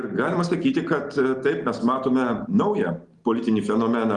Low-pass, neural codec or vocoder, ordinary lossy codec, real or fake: 10.8 kHz; none; Opus, 24 kbps; real